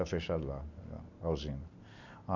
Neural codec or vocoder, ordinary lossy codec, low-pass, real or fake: none; none; 7.2 kHz; real